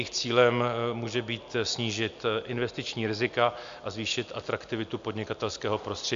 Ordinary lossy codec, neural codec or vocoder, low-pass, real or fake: MP3, 64 kbps; none; 7.2 kHz; real